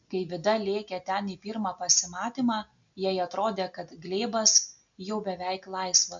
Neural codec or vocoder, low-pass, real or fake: none; 7.2 kHz; real